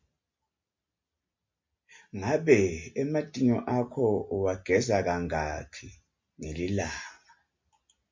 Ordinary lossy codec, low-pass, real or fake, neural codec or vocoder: MP3, 48 kbps; 7.2 kHz; real; none